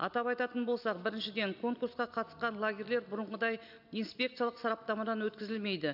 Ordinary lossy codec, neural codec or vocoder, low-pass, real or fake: none; none; 5.4 kHz; real